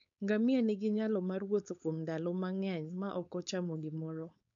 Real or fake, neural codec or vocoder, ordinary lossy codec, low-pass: fake; codec, 16 kHz, 4.8 kbps, FACodec; none; 7.2 kHz